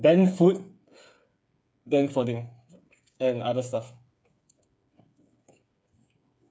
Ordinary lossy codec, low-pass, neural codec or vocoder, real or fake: none; none; codec, 16 kHz, 8 kbps, FreqCodec, smaller model; fake